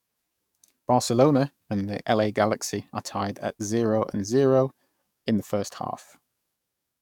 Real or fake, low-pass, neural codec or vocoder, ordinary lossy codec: fake; 19.8 kHz; codec, 44.1 kHz, 7.8 kbps, DAC; none